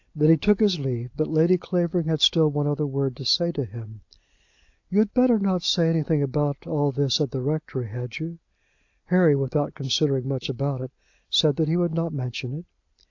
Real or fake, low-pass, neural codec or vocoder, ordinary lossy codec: real; 7.2 kHz; none; AAC, 48 kbps